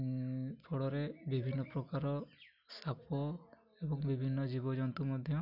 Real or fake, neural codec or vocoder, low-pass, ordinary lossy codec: real; none; 5.4 kHz; none